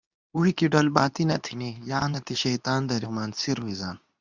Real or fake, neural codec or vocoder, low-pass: fake; codec, 24 kHz, 0.9 kbps, WavTokenizer, medium speech release version 2; 7.2 kHz